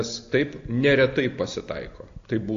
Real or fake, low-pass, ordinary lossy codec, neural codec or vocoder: real; 7.2 kHz; AAC, 48 kbps; none